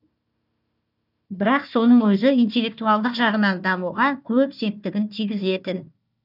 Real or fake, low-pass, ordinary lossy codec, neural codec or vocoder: fake; 5.4 kHz; none; codec, 16 kHz, 1 kbps, FunCodec, trained on Chinese and English, 50 frames a second